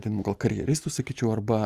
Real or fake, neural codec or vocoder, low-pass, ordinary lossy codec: real; none; 14.4 kHz; Opus, 32 kbps